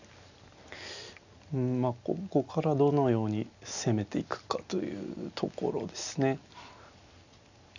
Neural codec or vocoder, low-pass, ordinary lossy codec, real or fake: none; 7.2 kHz; none; real